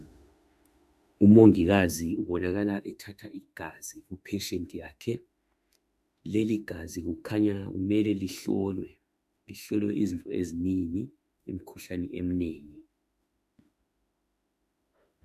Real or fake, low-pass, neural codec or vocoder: fake; 14.4 kHz; autoencoder, 48 kHz, 32 numbers a frame, DAC-VAE, trained on Japanese speech